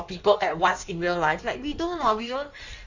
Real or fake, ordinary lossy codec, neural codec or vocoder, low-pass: fake; none; codec, 16 kHz in and 24 kHz out, 1.1 kbps, FireRedTTS-2 codec; 7.2 kHz